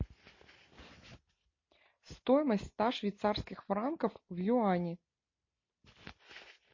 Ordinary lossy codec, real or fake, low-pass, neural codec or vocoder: MP3, 32 kbps; real; 7.2 kHz; none